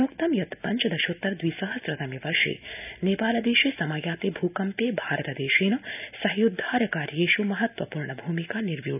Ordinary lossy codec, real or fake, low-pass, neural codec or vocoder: none; real; 3.6 kHz; none